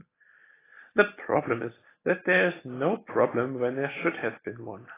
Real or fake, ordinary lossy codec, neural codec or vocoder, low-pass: real; AAC, 16 kbps; none; 3.6 kHz